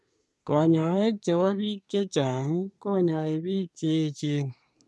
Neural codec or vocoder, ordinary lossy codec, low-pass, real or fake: codec, 24 kHz, 1 kbps, SNAC; none; none; fake